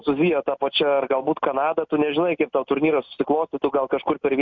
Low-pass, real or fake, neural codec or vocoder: 7.2 kHz; real; none